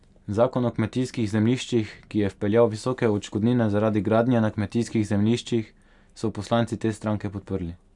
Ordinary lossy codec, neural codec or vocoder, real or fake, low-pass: none; none; real; 10.8 kHz